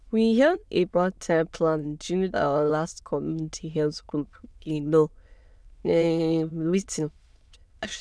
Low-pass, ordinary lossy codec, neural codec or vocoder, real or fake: none; none; autoencoder, 22.05 kHz, a latent of 192 numbers a frame, VITS, trained on many speakers; fake